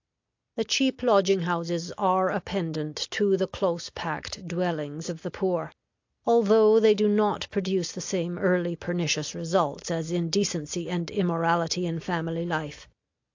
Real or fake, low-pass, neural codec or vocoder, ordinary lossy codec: real; 7.2 kHz; none; AAC, 48 kbps